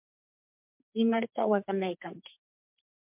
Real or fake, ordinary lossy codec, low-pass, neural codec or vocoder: fake; MP3, 32 kbps; 3.6 kHz; codec, 44.1 kHz, 2.6 kbps, SNAC